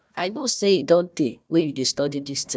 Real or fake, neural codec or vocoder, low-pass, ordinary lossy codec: fake; codec, 16 kHz, 1 kbps, FunCodec, trained on Chinese and English, 50 frames a second; none; none